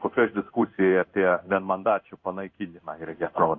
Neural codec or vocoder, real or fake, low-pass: codec, 16 kHz in and 24 kHz out, 1 kbps, XY-Tokenizer; fake; 7.2 kHz